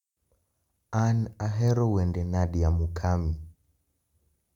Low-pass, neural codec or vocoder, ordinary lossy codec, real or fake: 19.8 kHz; none; none; real